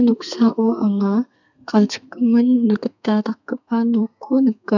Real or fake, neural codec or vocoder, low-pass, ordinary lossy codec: fake; codec, 32 kHz, 1.9 kbps, SNAC; 7.2 kHz; none